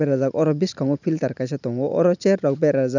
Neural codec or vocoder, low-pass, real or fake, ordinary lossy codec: none; 7.2 kHz; real; none